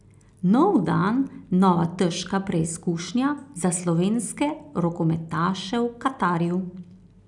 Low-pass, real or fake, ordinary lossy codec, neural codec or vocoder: 10.8 kHz; real; none; none